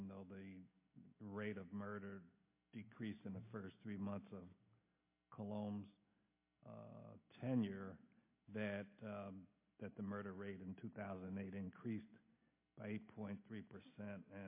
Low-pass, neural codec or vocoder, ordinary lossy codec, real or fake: 3.6 kHz; none; MP3, 24 kbps; real